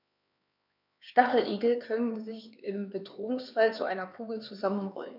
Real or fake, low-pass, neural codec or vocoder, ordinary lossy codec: fake; 5.4 kHz; codec, 16 kHz, 4 kbps, X-Codec, HuBERT features, trained on LibriSpeech; none